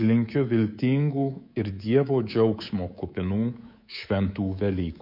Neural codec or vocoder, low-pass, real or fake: codec, 24 kHz, 3.1 kbps, DualCodec; 5.4 kHz; fake